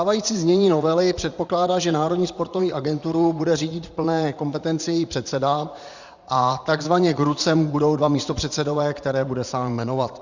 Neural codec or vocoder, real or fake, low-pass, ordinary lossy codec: vocoder, 44.1 kHz, 80 mel bands, Vocos; fake; 7.2 kHz; Opus, 64 kbps